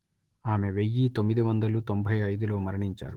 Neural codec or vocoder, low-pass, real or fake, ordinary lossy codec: autoencoder, 48 kHz, 128 numbers a frame, DAC-VAE, trained on Japanese speech; 19.8 kHz; fake; Opus, 16 kbps